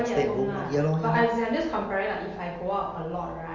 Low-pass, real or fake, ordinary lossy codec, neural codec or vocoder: 7.2 kHz; real; Opus, 32 kbps; none